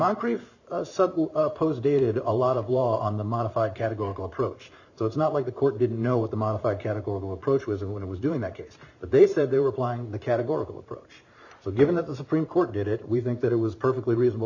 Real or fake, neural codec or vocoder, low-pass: fake; vocoder, 44.1 kHz, 128 mel bands every 512 samples, BigVGAN v2; 7.2 kHz